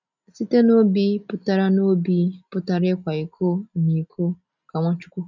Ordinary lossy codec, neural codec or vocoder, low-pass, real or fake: none; none; 7.2 kHz; real